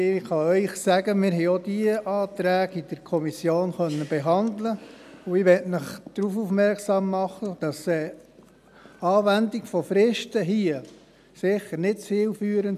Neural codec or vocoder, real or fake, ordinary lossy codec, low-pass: none; real; none; 14.4 kHz